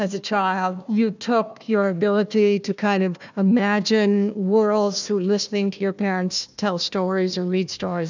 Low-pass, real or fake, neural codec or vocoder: 7.2 kHz; fake; codec, 16 kHz, 1 kbps, FunCodec, trained on Chinese and English, 50 frames a second